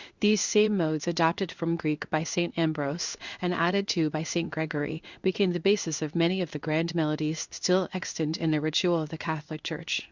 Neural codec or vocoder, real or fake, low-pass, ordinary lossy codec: codec, 16 kHz in and 24 kHz out, 1 kbps, XY-Tokenizer; fake; 7.2 kHz; Opus, 64 kbps